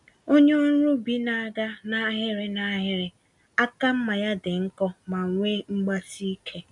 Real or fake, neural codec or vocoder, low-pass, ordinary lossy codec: real; none; 10.8 kHz; none